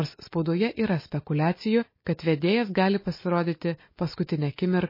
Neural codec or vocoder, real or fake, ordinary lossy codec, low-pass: none; real; MP3, 24 kbps; 5.4 kHz